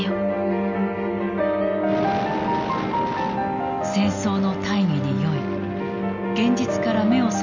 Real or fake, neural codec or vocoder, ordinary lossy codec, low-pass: real; none; none; 7.2 kHz